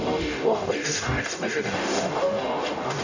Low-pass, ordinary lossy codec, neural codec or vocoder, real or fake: 7.2 kHz; none; codec, 44.1 kHz, 0.9 kbps, DAC; fake